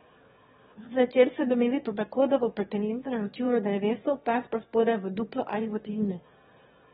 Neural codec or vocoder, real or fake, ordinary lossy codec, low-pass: autoencoder, 22.05 kHz, a latent of 192 numbers a frame, VITS, trained on one speaker; fake; AAC, 16 kbps; 9.9 kHz